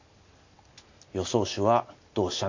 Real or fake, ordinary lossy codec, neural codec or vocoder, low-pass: real; AAC, 48 kbps; none; 7.2 kHz